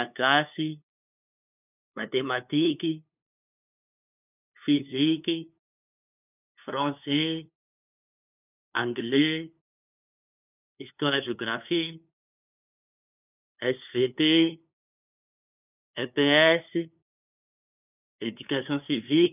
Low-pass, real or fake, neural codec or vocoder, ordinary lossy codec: 3.6 kHz; fake; codec, 16 kHz, 2 kbps, FunCodec, trained on LibriTTS, 25 frames a second; none